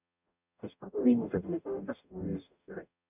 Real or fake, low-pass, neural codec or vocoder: fake; 3.6 kHz; codec, 44.1 kHz, 0.9 kbps, DAC